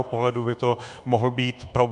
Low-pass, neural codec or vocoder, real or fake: 10.8 kHz; codec, 24 kHz, 1.2 kbps, DualCodec; fake